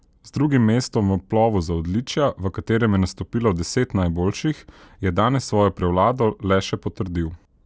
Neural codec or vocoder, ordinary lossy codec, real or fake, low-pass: none; none; real; none